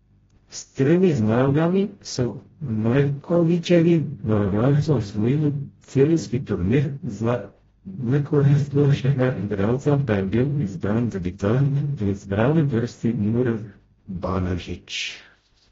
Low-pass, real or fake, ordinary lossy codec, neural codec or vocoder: 7.2 kHz; fake; AAC, 24 kbps; codec, 16 kHz, 0.5 kbps, FreqCodec, smaller model